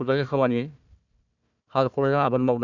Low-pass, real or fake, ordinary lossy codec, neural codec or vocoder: 7.2 kHz; fake; none; codec, 16 kHz, 1 kbps, FunCodec, trained on Chinese and English, 50 frames a second